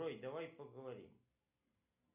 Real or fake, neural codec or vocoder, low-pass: real; none; 3.6 kHz